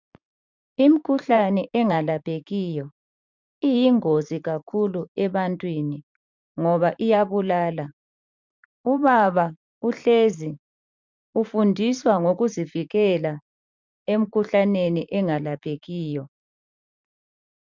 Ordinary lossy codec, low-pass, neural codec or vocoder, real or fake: MP3, 64 kbps; 7.2 kHz; vocoder, 44.1 kHz, 128 mel bands every 512 samples, BigVGAN v2; fake